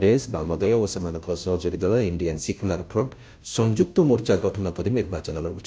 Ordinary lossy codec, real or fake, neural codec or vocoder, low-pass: none; fake; codec, 16 kHz, 0.5 kbps, FunCodec, trained on Chinese and English, 25 frames a second; none